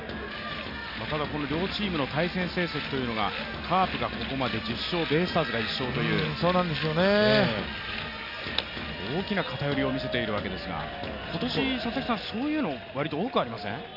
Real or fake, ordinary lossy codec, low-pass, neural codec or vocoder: real; none; 5.4 kHz; none